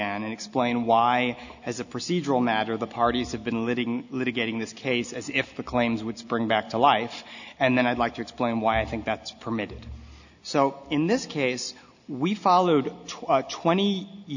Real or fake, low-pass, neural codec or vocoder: real; 7.2 kHz; none